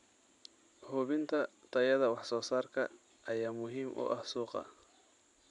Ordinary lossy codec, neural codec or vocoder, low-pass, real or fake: none; none; 10.8 kHz; real